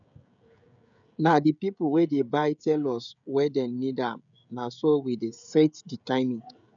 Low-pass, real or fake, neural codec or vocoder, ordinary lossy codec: 7.2 kHz; fake; codec, 16 kHz, 16 kbps, FreqCodec, smaller model; none